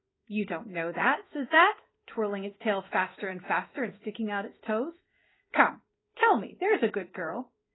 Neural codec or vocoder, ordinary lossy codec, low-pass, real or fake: codec, 16 kHz in and 24 kHz out, 1 kbps, XY-Tokenizer; AAC, 16 kbps; 7.2 kHz; fake